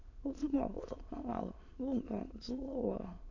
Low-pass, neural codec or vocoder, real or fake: 7.2 kHz; autoencoder, 22.05 kHz, a latent of 192 numbers a frame, VITS, trained on many speakers; fake